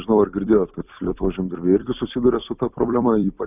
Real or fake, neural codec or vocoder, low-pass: fake; vocoder, 44.1 kHz, 128 mel bands every 256 samples, BigVGAN v2; 3.6 kHz